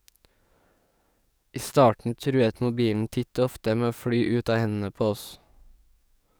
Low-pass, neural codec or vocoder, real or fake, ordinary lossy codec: none; codec, 44.1 kHz, 7.8 kbps, DAC; fake; none